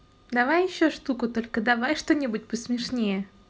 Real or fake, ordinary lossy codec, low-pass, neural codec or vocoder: real; none; none; none